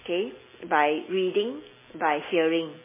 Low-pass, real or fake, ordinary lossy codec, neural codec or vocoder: 3.6 kHz; real; MP3, 16 kbps; none